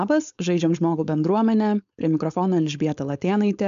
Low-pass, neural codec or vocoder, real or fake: 7.2 kHz; codec, 16 kHz, 4.8 kbps, FACodec; fake